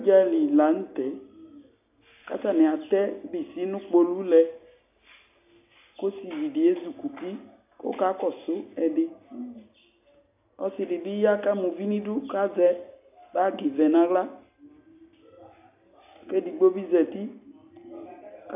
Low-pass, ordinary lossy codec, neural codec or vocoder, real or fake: 3.6 kHz; AAC, 32 kbps; none; real